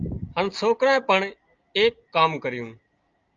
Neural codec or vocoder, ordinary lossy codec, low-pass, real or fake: none; Opus, 24 kbps; 7.2 kHz; real